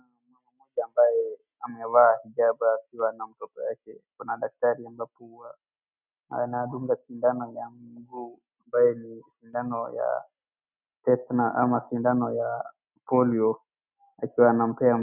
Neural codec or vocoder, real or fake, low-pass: none; real; 3.6 kHz